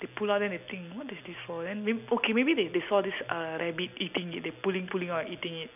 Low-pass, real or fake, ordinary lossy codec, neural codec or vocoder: 3.6 kHz; real; none; none